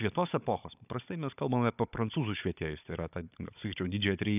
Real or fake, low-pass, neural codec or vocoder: fake; 3.6 kHz; codec, 16 kHz, 16 kbps, FunCodec, trained on Chinese and English, 50 frames a second